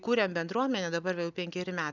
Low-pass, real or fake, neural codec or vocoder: 7.2 kHz; real; none